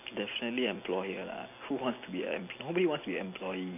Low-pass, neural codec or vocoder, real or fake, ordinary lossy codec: 3.6 kHz; none; real; none